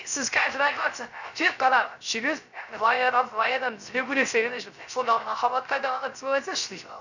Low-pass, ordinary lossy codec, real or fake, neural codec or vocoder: 7.2 kHz; none; fake; codec, 16 kHz, 0.3 kbps, FocalCodec